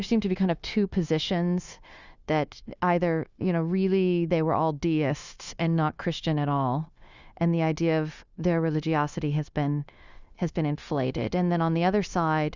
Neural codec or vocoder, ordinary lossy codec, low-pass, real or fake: codec, 16 kHz, 0.9 kbps, LongCat-Audio-Codec; Opus, 64 kbps; 7.2 kHz; fake